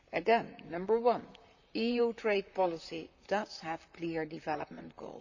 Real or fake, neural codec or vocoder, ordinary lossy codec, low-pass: fake; vocoder, 44.1 kHz, 128 mel bands, Pupu-Vocoder; none; 7.2 kHz